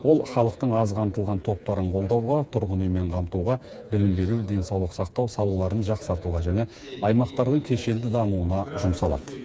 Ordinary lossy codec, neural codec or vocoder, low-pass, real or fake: none; codec, 16 kHz, 4 kbps, FreqCodec, smaller model; none; fake